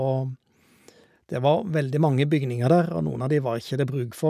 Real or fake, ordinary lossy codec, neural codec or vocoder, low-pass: real; none; none; 14.4 kHz